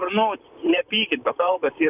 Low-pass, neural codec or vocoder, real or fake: 3.6 kHz; vocoder, 44.1 kHz, 128 mel bands, Pupu-Vocoder; fake